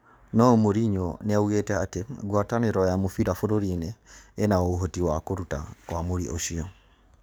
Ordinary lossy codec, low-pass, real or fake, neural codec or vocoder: none; none; fake; codec, 44.1 kHz, 7.8 kbps, DAC